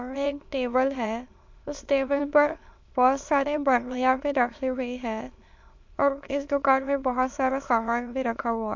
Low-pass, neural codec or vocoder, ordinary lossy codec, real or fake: 7.2 kHz; autoencoder, 22.05 kHz, a latent of 192 numbers a frame, VITS, trained on many speakers; MP3, 48 kbps; fake